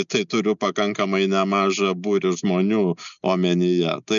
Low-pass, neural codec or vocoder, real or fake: 7.2 kHz; none; real